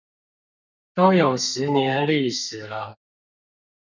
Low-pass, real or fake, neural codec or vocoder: 7.2 kHz; fake; codec, 32 kHz, 1.9 kbps, SNAC